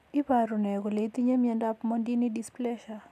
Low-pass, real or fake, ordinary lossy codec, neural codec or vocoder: 14.4 kHz; real; none; none